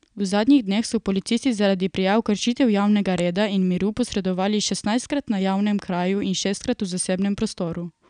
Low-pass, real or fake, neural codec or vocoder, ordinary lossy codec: 9.9 kHz; real; none; none